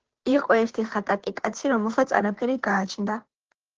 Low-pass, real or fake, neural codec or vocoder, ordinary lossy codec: 7.2 kHz; fake; codec, 16 kHz, 2 kbps, FunCodec, trained on Chinese and English, 25 frames a second; Opus, 16 kbps